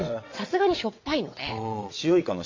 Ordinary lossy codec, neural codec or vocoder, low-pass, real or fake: MP3, 64 kbps; vocoder, 22.05 kHz, 80 mel bands, WaveNeXt; 7.2 kHz; fake